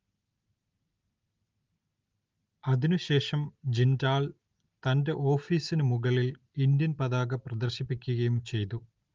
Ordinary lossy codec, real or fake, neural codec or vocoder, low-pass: Opus, 32 kbps; real; none; 7.2 kHz